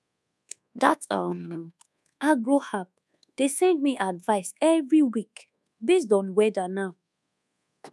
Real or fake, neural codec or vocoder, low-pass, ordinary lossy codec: fake; codec, 24 kHz, 1.2 kbps, DualCodec; none; none